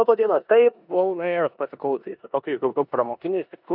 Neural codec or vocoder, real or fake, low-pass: codec, 16 kHz in and 24 kHz out, 0.9 kbps, LongCat-Audio-Codec, four codebook decoder; fake; 5.4 kHz